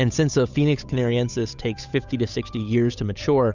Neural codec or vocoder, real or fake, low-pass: codec, 16 kHz, 8 kbps, FunCodec, trained on Chinese and English, 25 frames a second; fake; 7.2 kHz